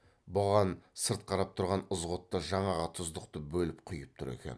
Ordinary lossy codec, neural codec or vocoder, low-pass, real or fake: none; none; none; real